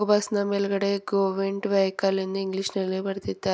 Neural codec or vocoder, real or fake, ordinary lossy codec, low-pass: none; real; none; none